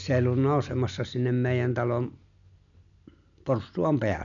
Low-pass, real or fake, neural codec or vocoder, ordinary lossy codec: 7.2 kHz; real; none; none